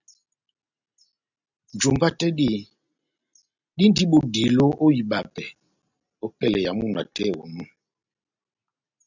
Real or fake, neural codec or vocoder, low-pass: real; none; 7.2 kHz